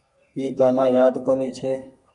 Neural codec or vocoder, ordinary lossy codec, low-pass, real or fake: codec, 32 kHz, 1.9 kbps, SNAC; MP3, 96 kbps; 10.8 kHz; fake